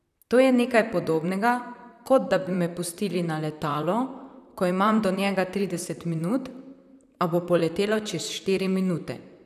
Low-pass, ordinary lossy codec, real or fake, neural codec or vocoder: 14.4 kHz; none; fake; vocoder, 44.1 kHz, 128 mel bands, Pupu-Vocoder